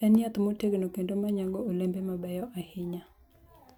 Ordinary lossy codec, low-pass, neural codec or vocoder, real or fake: none; 19.8 kHz; none; real